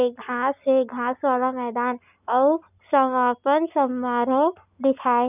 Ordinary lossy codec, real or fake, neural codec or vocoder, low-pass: none; real; none; 3.6 kHz